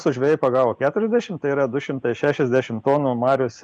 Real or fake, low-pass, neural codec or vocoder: real; 9.9 kHz; none